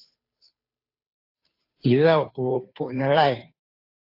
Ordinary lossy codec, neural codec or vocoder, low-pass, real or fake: AAC, 32 kbps; codec, 16 kHz, 2 kbps, FunCodec, trained on Chinese and English, 25 frames a second; 5.4 kHz; fake